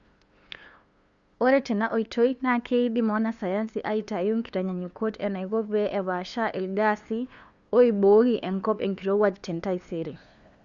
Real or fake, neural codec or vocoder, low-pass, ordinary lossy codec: fake; codec, 16 kHz, 2 kbps, FunCodec, trained on LibriTTS, 25 frames a second; 7.2 kHz; Opus, 64 kbps